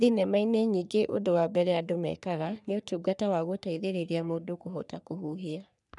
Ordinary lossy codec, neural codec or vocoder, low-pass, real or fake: none; codec, 44.1 kHz, 3.4 kbps, Pupu-Codec; 10.8 kHz; fake